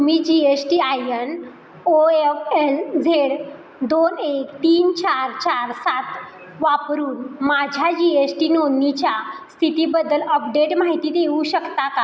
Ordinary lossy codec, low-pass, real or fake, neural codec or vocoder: none; none; real; none